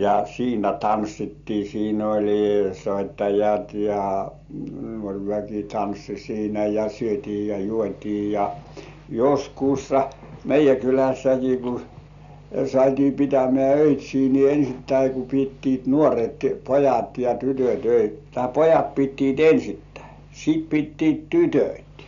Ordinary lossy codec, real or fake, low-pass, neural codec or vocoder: none; real; 7.2 kHz; none